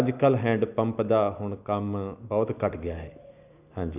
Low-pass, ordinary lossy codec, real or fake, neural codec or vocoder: 3.6 kHz; none; real; none